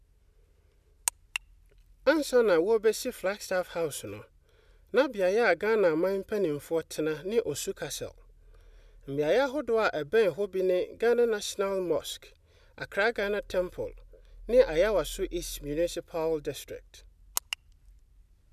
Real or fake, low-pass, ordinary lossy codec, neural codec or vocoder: real; 14.4 kHz; none; none